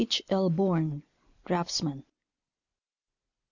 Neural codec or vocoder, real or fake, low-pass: none; real; 7.2 kHz